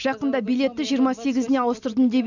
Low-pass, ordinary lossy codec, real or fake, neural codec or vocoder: 7.2 kHz; none; real; none